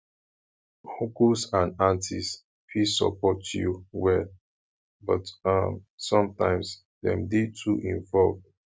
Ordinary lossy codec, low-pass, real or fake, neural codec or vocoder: none; none; real; none